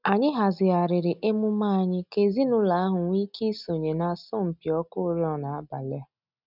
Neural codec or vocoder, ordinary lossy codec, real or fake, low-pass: none; none; real; 5.4 kHz